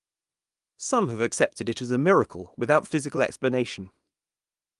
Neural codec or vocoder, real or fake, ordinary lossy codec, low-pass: codec, 24 kHz, 0.9 kbps, WavTokenizer, small release; fake; Opus, 32 kbps; 10.8 kHz